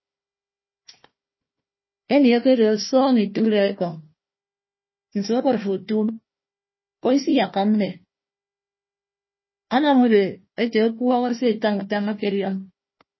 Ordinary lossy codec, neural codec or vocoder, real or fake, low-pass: MP3, 24 kbps; codec, 16 kHz, 1 kbps, FunCodec, trained on Chinese and English, 50 frames a second; fake; 7.2 kHz